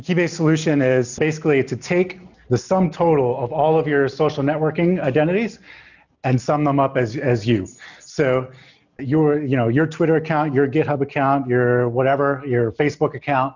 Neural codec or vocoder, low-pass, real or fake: none; 7.2 kHz; real